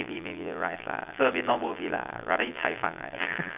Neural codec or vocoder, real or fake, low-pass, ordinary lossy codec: vocoder, 22.05 kHz, 80 mel bands, Vocos; fake; 3.6 kHz; none